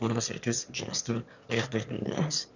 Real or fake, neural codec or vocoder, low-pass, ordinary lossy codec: fake; autoencoder, 22.05 kHz, a latent of 192 numbers a frame, VITS, trained on one speaker; 7.2 kHz; none